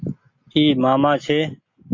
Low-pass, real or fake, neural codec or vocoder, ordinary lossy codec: 7.2 kHz; real; none; AAC, 48 kbps